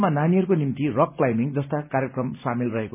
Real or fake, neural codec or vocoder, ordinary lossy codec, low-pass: real; none; none; 3.6 kHz